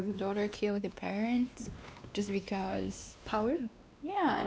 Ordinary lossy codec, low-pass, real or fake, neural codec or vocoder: none; none; fake; codec, 16 kHz, 2 kbps, X-Codec, WavLM features, trained on Multilingual LibriSpeech